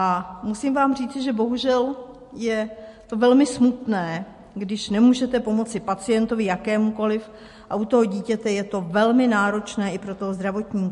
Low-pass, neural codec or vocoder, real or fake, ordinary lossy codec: 14.4 kHz; none; real; MP3, 48 kbps